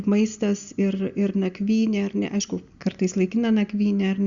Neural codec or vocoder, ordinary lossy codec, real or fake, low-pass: none; Opus, 64 kbps; real; 7.2 kHz